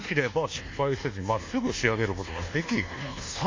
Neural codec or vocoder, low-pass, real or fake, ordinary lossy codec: codec, 24 kHz, 1.2 kbps, DualCodec; 7.2 kHz; fake; MP3, 48 kbps